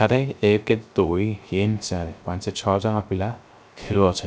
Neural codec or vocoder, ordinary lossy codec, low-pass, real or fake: codec, 16 kHz, 0.3 kbps, FocalCodec; none; none; fake